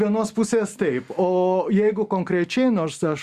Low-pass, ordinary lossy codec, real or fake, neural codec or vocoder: 14.4 kHz; Opus, 64 kbps; real; none